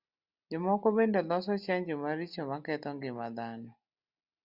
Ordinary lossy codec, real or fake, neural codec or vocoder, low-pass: Opus, 64 kbps; real; none; 5.4 kHz